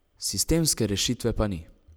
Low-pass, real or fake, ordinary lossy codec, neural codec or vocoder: none; real; none; none